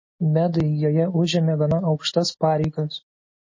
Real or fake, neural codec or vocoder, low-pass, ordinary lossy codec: real; none; 7.2 kHz; MP3, 32 kbps